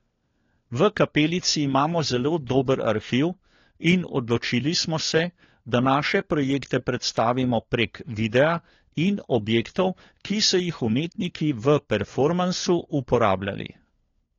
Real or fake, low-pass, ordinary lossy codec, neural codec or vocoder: fake; 7.2 kHz; AAC, 32 kbps; codec, 16 kHz, 4 kbps, FunCodec, trained on LibriTTS, 50 frames a second